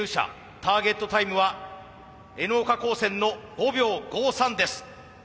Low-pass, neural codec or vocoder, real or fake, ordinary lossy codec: none; none; real; none